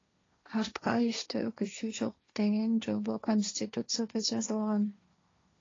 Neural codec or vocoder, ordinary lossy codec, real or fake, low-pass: codec, 16 kHz, 1.1 kbps, Voila-Tokenizer; AAC, 32 kbps; fake; 7.2 kHz